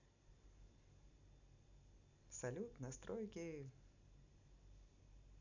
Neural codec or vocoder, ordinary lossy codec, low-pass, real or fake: none; none; 7.2 kHz; real